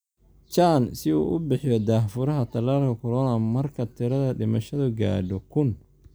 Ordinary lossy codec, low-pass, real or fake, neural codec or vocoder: none; none; real; none